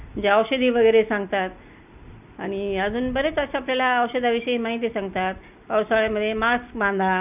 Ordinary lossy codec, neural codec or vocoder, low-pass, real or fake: none; none; 3.6 kHz; real